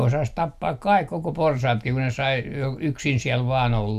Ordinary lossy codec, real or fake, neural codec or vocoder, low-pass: none; real; none; 14.4 kHz